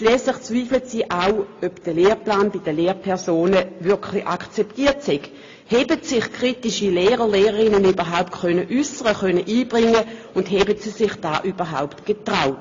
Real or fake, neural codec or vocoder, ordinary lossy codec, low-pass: real; none; AAC, 32 kbps; 7.2 kHz